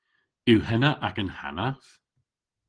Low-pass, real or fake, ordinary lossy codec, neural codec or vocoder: 9.9 kHz; real; Opus, 16 kbps; none